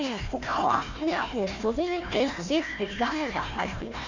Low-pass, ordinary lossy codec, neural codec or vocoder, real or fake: 7.2 kHz; none; codec, 16 kHz, 1 kbps, FunCodec, trained on Chinese and English, 50 frames a second; fake